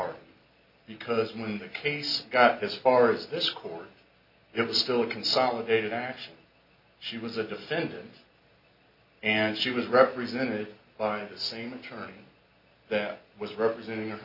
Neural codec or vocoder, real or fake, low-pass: none; real; 5.4 kHz